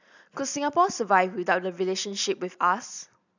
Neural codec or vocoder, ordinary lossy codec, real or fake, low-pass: none; none; real; 7.2 kHz